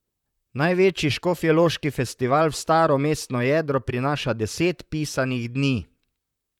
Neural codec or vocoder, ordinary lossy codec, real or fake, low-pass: vocoder, 44.1 kHz, 128 mel bands, Pupu-Vocoder; none; fake; 19.8 kHz